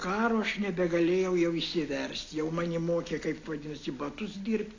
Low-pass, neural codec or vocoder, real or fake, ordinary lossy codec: 7.2 kHz; none; real; AAC, 32 kbps